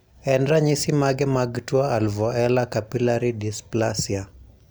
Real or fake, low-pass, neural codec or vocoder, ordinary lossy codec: real; none; none; none